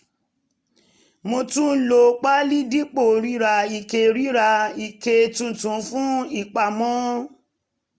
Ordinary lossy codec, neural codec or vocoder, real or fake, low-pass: none; none; real; none